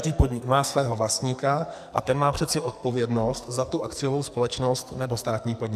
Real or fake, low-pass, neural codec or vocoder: fake; 14.4 kHz; codec, 44.1 kHz, 2.6 kbps, SNAC